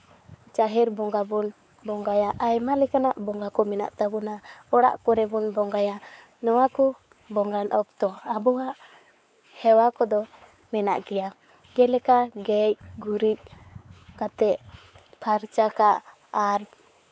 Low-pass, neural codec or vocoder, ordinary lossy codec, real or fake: none; codec, 16 kHz, 4 kbps, X-Codec, WavLM features, trained on Multilingual LibriSpeech; none; fake